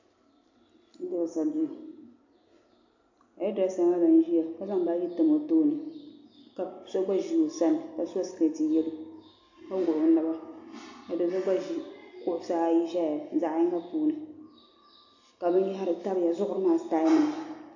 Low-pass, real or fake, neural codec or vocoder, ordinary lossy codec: 7.2 kHz; real; none; AAC, 48 kbps